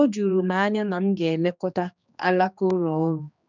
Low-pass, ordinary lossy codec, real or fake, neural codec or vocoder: 7.2 kHz; none; fake; codec, 16 kHz, 2 kbps, X-Codec, HuBERT features, trained on general audio